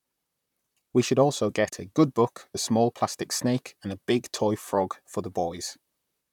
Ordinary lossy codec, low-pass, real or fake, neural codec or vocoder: none; 19.8 kHz; fake; vocoder, 44.1 kHz, 128 mel bands, Pupu-Vocoder